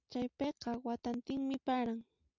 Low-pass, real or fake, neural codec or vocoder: 7.2 kHz; real; none